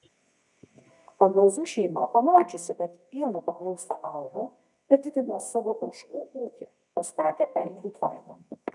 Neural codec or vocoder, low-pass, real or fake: codec, 24 kHz, 0.9 kbps, WavTokenizer, medium music audio release; 10.8 kHz; fake